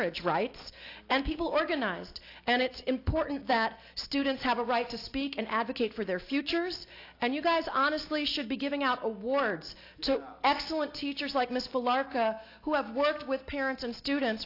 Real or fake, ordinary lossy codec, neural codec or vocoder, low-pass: real; AAC, 32 kbps; none; 5.4 kHz